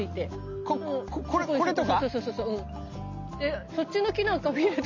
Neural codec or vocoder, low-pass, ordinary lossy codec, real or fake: none; 7.2 kHz; MP3, 64 kbps; real